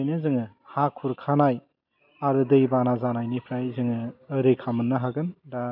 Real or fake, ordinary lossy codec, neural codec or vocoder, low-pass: real; none; none; 5.4 kHz